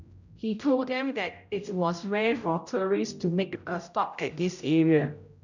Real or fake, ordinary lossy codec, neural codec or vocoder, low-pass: fake; none; codec, 16 kHz, 0.5 kbps, X-Codec, HuBERT features, trained on general audio; 7.2 kHz